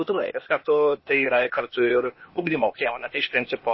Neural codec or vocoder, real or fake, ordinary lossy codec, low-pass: codec, 16 kHz, 0.8 kbps, ZipCodec; fake; MP3, 24 kbps; 7.2 kHz